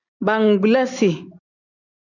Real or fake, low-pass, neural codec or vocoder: real; 7.2 kHz; none